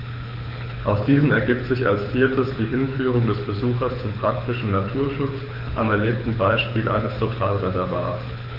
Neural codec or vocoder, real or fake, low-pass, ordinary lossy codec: codec, 24 kHz, 6 kbps, HILCodec; fake; 5.4 kHz; none